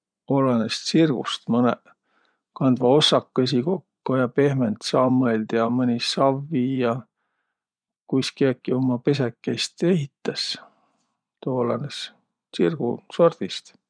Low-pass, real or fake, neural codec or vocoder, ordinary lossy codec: 9.9 kHz; fake; vocoder, 24 kHz, 100 mel bands, Vocos; none